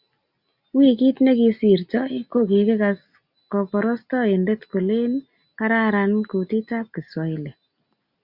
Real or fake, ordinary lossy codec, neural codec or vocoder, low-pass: real; Opus, 64 kbps; none; 5.4 kHz